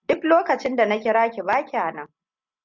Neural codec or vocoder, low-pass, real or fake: none; 7.2 kHz; real